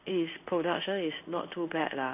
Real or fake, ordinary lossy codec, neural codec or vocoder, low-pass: fake; none; codec, 16 kHz in and 24 kHz out, 1 kbps, XY-Tokenizer; 3.6 kHz